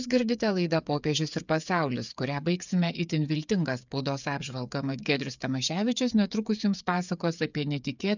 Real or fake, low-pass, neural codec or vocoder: fake; 7.2 kHz; codec, 16 kHz, 8 kbps, FreqCodec, smaller model